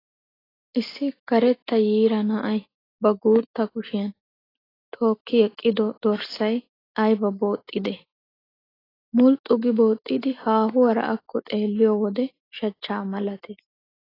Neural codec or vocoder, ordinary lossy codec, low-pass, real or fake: none; AAC, 24 kbps; 5.4 kHz; real